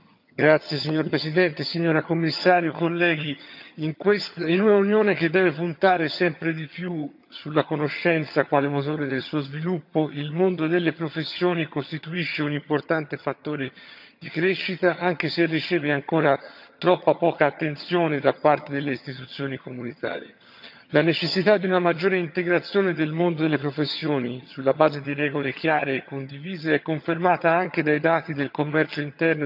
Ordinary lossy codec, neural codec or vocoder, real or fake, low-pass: none; vocoder, 22.05 kHz, 80 mel bands, HiFi-GAN; fake; 5.4 kHz